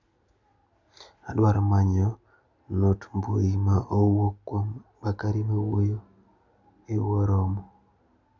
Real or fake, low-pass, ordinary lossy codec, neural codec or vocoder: real; 7.2 kHz; none; none